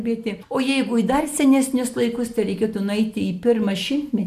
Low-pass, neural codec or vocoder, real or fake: 14.4 kHz; none; real